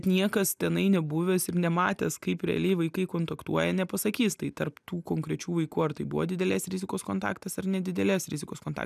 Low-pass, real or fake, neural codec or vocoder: 14.4 kHz; real; none